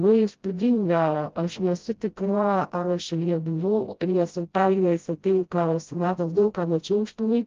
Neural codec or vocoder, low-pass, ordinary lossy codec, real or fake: codec, 16 kHz, 0.5 kbps, FreqCodec, smaller model; 7.2 kHz; Opus, 32 kbps; fake